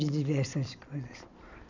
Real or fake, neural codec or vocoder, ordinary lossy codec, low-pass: real; none; none; 7.2 kHz